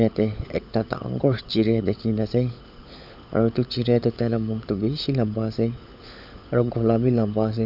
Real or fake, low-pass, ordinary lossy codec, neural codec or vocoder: fake; 5.4 kHz; none; codec, 16 kHz, 16 kbps, FunCodec, trained on LibriTTS, 50 frames a second